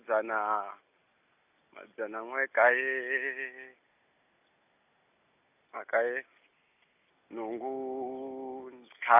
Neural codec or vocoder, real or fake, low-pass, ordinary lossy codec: none; real; 3.6 kHz; none